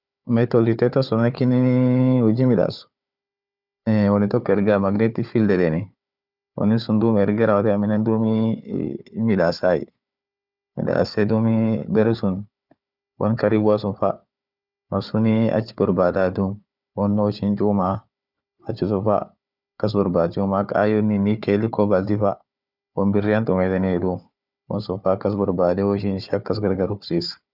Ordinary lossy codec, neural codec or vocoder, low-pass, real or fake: none; codec, 16 kHz, 4 kbps, FunCodec, trained on Chinese and English, 50 frames a second; 5.4 kHz; fake